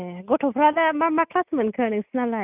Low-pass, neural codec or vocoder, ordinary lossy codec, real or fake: 3.6 kHz; none; none; real